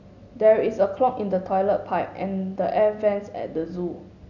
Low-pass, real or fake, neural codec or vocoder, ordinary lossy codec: 7.2 kHz; real; none; none